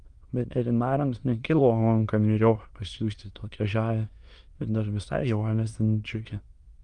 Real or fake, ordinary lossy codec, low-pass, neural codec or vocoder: fake; Opus, 24 kbps; 9.9 kHz; autoencoder, 22.05 kHz, a latent of 192 numbers a frame, VITS, trained on many speakers